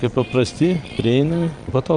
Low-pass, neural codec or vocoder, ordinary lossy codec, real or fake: 9.9 kHz; vocoder, 22.05 kHz, 80 mel bands, Vocos; Opus, 64 kbps; fake